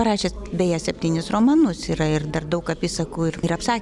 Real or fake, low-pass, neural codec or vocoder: fake; 10.8 kHz; vocoder, 44.1 kHz, 128 mel bands every 256 samples, BigVGAN v2